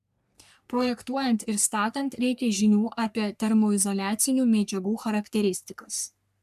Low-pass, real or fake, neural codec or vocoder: 14.4 kHz; fake; codec, 44.1 kHz, 3.4 kbps, Pupu-Codec